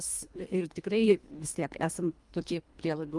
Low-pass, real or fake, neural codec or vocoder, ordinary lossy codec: 10.8 kHz; fake; codec, 24 kHz, 1.5 kbps, HILCodec; Opus, 32 kbps